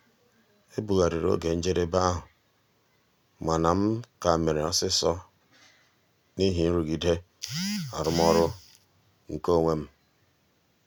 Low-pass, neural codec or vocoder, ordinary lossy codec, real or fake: none; none; none; real